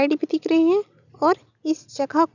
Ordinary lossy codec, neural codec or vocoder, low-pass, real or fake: none; none; 7.2 kHz; real